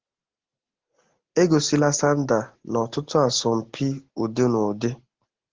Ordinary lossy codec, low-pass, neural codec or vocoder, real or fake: Opus, 16 kbps; 7.2 kHz; none; real